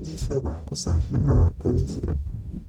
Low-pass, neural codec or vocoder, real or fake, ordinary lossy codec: 19.8 kHz; codec, 44.1 kHz, 0.9 kbps, DAC; fake; none